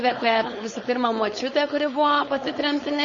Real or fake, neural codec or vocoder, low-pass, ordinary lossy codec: fake; codec, 16 kHz, 4.8 kbps, FACodec; 7.2 kHz; MP3, 32 kbps